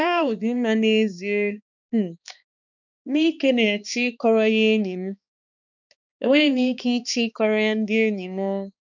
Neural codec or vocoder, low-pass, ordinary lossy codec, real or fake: codec, 16 kHz, 2 kbps, X-Codec, HuBERT features, trained on balanced general audio; 7.2 kHz; none; fake